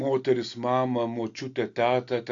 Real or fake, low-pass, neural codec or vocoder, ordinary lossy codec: real; 7.2 kHz; none; AAC, 48 kbps